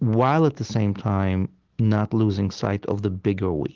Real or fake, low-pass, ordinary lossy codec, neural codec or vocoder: real; 7.2 kHz; Opus, 16 kbps; none